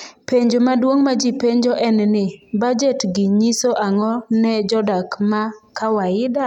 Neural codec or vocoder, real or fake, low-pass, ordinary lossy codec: none; real; 19.8 kHz; none